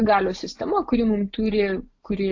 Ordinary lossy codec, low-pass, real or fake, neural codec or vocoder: AAC, 48 kbps; 7.2 kHz; real; none